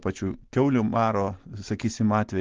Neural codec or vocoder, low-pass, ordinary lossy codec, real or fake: none; 7.2 kHz; Opus, 16 kbps; real